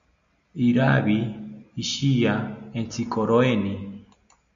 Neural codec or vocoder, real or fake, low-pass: none; real; 7.2 kHz